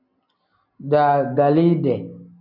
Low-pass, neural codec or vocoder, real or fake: 5.4 kHz; none; real